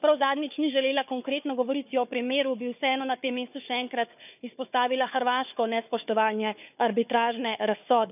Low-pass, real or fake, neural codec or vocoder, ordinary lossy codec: 3.6 kHz; fake; codec, 16 kHz, 4 kbps, FunCodec, trained on Chinese and English, 50 frames a second; none